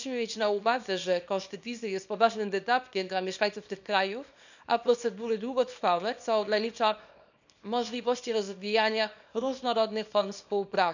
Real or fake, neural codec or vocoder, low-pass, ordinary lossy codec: fake; codec, 24 kHz, 0.9 kbps, WavTokenizer, small release; 7.2 kHz; none